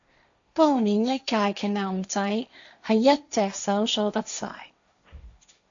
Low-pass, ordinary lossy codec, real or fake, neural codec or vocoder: 7.2 kHz; MP3, 64 kbps; fake; codec, 16 kHz, 1.1 kbps, Voila-Tokenizer